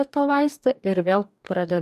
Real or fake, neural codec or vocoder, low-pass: fake; codec, 44.1 kHz, 2.6 kbps, DAC; 14.4 kHz